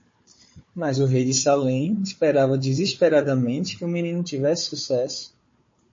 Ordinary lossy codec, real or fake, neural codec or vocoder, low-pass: MP3, 32 kbps; fake; codec, 16 kHz, 4 kbps, FunCodec, trained on Chinese and English, 50 frames a second; 7.2 kHz